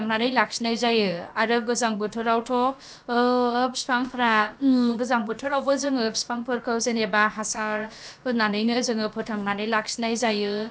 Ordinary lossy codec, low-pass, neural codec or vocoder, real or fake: none; none; codec, 16 kHz, about 1 kbps, DyCAST, with the encoder's durations; fake